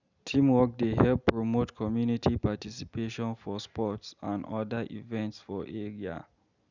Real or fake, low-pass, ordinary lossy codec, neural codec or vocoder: real; 7.2 kHz; none; none